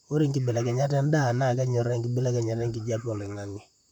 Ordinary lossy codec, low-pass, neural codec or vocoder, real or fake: none; 19.8 kHz; vocoder, 44.1 kHz, 128 mel bands, Pupu-Vocoder; fake